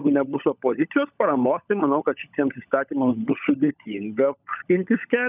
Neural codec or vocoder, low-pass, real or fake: codec, 16 kHz, 16 kbps, FunCodec, trained on LibriTTS, 50 frames a second; 3.6 kHz; fake